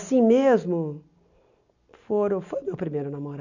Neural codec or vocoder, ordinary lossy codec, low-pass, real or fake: none; none; 7.2 kHz; real